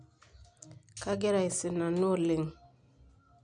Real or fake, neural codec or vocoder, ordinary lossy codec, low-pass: real; none; none; 9.9 kHz